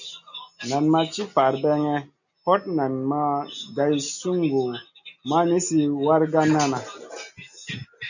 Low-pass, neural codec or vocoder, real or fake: 7.2 kHz; none; real